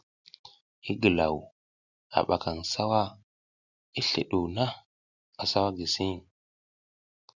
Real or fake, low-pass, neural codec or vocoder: real; 7.2 kHz; none